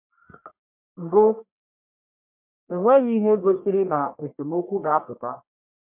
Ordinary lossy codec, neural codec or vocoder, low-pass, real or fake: MP3, 32 kbps; codec, 44.1 kHz, 1.7 kbps, Pupu-Codec; 3.6 kHz; fake